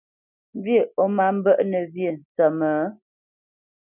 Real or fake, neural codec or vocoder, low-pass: real; none; 3.6 kHz